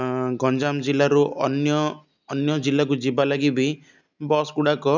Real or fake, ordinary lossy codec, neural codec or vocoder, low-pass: real; none; none; 7.2 kHz